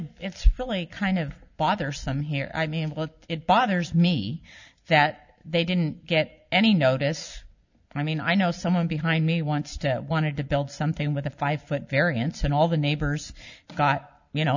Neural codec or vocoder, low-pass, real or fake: none; 7.2 kHz; real